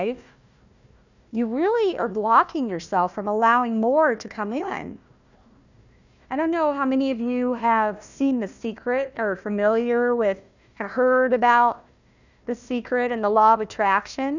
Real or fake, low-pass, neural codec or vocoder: fake; 7.2 kHz; codec, 16 kHz, 1 kbps, FunCodec, trained on Chinese and English, 50 frames a second